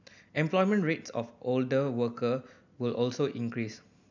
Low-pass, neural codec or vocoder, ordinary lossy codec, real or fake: 7.2 kHz; none; none; real